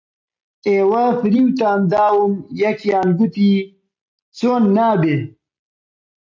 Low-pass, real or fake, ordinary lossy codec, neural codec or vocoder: 7.2 kHz; real; MP3, 64 kbps; none